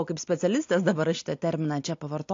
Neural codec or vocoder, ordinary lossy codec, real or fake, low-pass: none; AAC, 48 kbps; real; 7.2 kHz